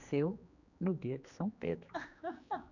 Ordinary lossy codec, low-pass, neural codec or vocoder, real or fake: none; 7.2 kHz; codec, 16 kHz, 2 kbps, X-Codec, HuBERT features, trained on general audio; fake